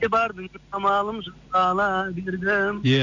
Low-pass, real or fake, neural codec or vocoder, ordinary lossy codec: 7.2 kHz; real; none; none